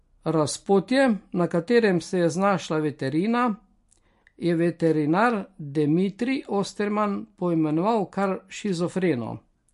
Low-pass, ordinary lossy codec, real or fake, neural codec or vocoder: 14.4 kHz; MP3, 48 kbps; real; none